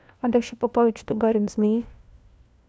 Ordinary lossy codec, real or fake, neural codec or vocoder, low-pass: none; fake; codec, 16 kHz, 1 kbps, FunCodec, trained on LibriTTS, 50 frames a second; none